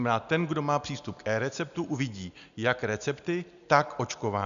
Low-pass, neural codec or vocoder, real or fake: 7.2 kHz; none; real